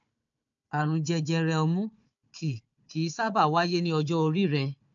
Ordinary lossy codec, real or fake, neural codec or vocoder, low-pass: AAC, 64 kbps; fake; codec, 16 kHz, 4 kbps, FunCodec, trained on Chinese and English, 50 frames a second; 7.2 kHz